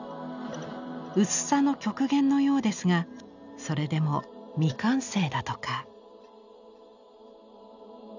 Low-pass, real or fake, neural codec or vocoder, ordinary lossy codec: 7.2 kHz; real; none; none